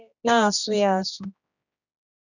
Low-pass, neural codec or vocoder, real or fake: 7.2 kHz; codec, 16 kHz, 1 kbps, X-Codec, HuBERT features, trained on general audio; fake